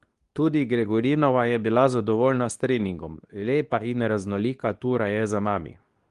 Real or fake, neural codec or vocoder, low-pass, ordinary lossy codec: fake; codec, 24 kHz, 0.9 kbps, WavTokenizer, medium speech release version 2; 10.8 kHz; Opus, 24 kbps